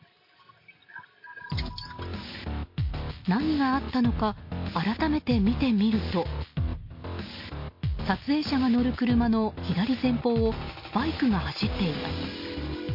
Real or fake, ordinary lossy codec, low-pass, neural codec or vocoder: real; MP3, 32 kbps; 5.4 kHz; none